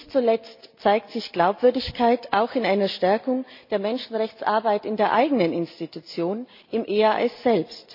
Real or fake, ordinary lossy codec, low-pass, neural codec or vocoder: real; MP3, 32 kbps; 5.4 kHz; none